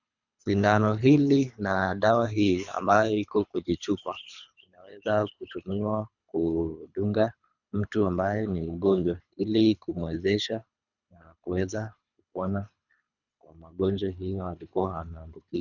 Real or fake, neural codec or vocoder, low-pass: fake; codec, 24 kHz, 3 kbps, HILCodec; 7.2 kHz